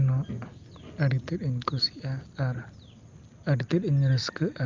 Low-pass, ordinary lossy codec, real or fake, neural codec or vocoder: 7.2 kHz; Opus, 24 kbps; real; none